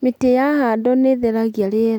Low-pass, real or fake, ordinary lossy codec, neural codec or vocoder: 19.8 kHz; real; none; none